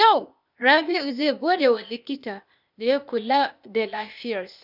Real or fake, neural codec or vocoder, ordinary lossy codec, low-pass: fake; codec, 16 kHz, 0.8 kbps, ZipCodec; none; 5.4 kHz